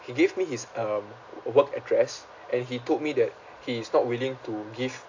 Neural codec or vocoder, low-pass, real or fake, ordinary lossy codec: none; 7.2 kHz; real; AAC, 48 kbps